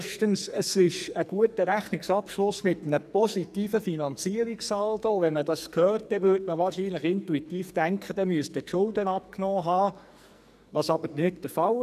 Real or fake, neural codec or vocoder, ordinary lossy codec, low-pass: fake; codec, 44.1 kHz, 2.6 kbps, SNAC; none; 14.4 kHz